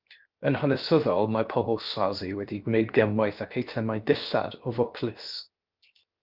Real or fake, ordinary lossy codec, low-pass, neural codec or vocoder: fake; Opus, 32 kbps; 5.4 kHz; codec, 16 kHz, 0.7 kbps, FocalCodec